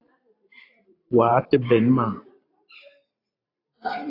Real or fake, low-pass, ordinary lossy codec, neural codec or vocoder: fake; 5.4 kHz; AAC, 32 kbps; vocoder, 44.1 kHz, 128 mel bands every 512 samples, BigVGAN v2